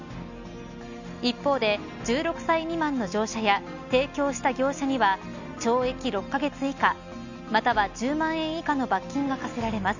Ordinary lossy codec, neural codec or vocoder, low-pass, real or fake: none; none; 7.2 kHz; real